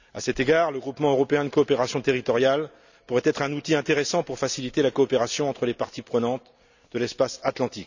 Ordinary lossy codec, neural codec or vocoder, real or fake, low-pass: none; none; real; 7.2 kHz